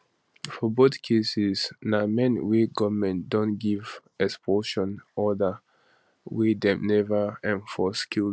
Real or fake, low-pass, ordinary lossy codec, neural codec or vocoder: real; none; none; none